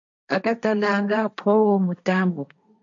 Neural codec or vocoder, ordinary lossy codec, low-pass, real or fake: codec, 16 kHz, 1.1 kbps, Voila-Tokenizer; AAC, 64 kbps; 7.2 kHz; fake